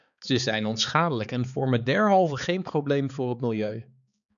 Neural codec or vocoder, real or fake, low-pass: codec, 16 kHz, 4 kbps, X-Codec, HuBERT features, trained on balanced general audio; fake; 7.2 kHz